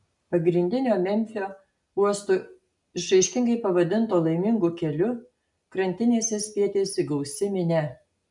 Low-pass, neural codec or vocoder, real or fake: 10.8 kHz; none; real